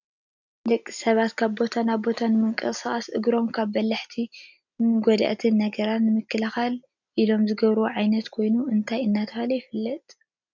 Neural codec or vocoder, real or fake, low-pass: none; real; 7.2 kHz